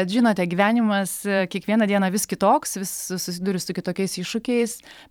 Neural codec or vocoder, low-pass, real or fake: vocoder, 44.1 kHz, 128 mel bands every 512 samples, BigVGAN v2; 19.8 kHz; fake